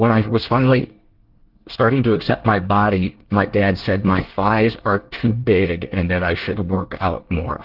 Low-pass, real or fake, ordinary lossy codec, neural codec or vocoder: 5.4 kHz; fake; Opus, 16 kbps; codec, 24 kHz, 1 kbps, SNAC